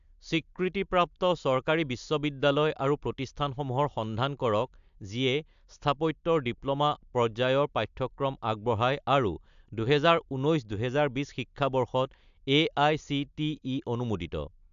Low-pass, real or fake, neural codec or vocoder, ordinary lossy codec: 7.2 kHz; real; none; AAC, 96 kbps